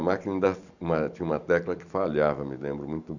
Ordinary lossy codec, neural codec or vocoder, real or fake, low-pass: none; vocoder, 44.1 kHz, 128 mel bands every 256 samples, BigVGAN v2; fake; 7.2 kHz